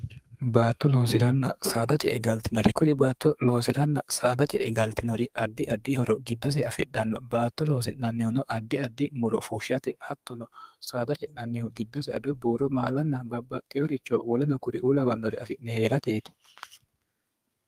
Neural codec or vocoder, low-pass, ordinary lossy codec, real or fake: codec, 32 kHz, 1.9 kbps, SNAC; 14.4 kHz; Opus, 32 kbps; fake